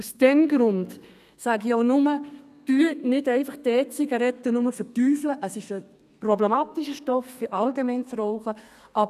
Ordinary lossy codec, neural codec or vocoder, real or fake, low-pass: none; codec, 32 kHz, 1.9 kbps, SNAC; fake; 14.4 kHz